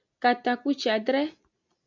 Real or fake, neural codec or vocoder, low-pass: real; none; 7.2 kHz